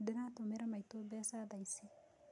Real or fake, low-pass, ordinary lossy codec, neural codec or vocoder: real; 10.8 kHz; MP3, 64 kbps; none